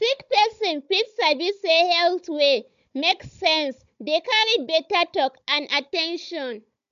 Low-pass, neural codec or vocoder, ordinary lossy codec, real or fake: 7.2 kHz; codec, 16 kHz, 16 kbps, FunCodec, trained on Chinese and English, 50 frames a second; MP3, 64 kbps; fake